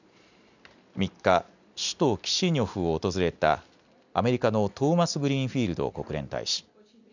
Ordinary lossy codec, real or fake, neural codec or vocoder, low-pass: none; real; none; 7.2 kHz